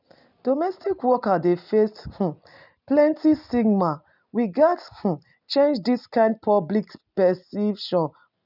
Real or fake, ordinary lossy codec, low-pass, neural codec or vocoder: real; none; 5.4 kHz; none